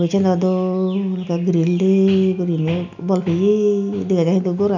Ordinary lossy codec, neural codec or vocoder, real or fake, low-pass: none; none; real; 7.2 kHz